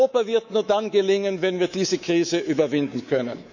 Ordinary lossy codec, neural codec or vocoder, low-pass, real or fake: none; codec, 24 kHz, 3.1 kbps, DualCodec; 7.2 kHz; fake